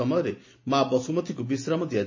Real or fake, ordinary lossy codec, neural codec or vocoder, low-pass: real; MP3, 32 kbps; none; 7.2 kHz